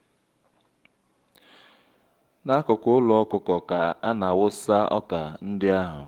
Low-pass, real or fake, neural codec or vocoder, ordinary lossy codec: 19.8 kHz; fake; codec, 44.1 kHz, 7.8 kbps, DAC; Opus, 24 kbps